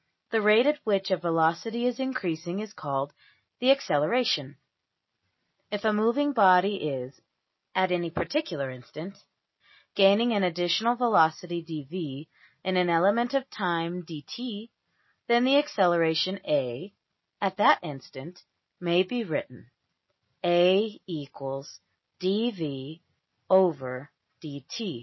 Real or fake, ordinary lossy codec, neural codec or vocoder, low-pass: real; MP3, 24 kbps; none; 7.2 kHz